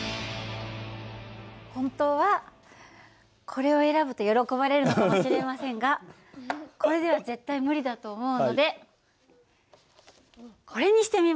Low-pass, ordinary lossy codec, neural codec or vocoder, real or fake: none; none; none; real